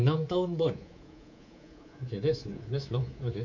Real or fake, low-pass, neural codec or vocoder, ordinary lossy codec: fake; 7.2 kHz; codec, 24 kHz, 3.1 kbps, DualCodec; none